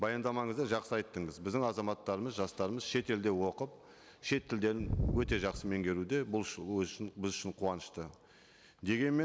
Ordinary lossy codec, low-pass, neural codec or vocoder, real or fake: none; none; none; real